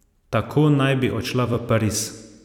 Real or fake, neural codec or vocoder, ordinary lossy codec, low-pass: fake; vocoder, 44.1 kHz, 128 mel bands every 256 samples, BigVGAN v2; none; 19.8 kHz